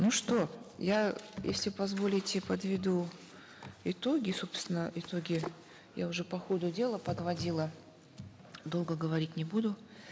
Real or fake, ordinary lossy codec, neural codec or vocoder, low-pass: real; none; none; none